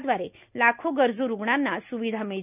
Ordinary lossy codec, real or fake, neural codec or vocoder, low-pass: none; real; none; 3.6 kHz